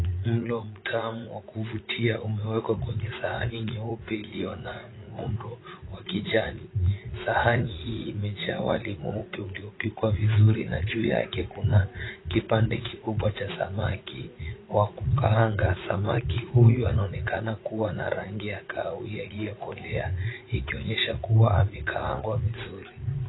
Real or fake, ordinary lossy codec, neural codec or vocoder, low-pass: fake; AAC, 16 kbps; vocoder, 44.1 kHz, 80 mel bands, Vocos; 7.2 kHz